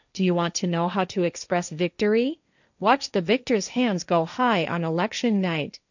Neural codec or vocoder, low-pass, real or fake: codec, 16 kHz, 1.1 kbps, Voila-Tokenizer; 7.2 kHz; fake